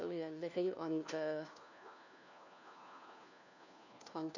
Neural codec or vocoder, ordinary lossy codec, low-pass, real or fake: codec, 16 kHz, 1 kbps, FunCodec, trained on LibriTTS, 50 frames a second; none; 7.2 kHz; fake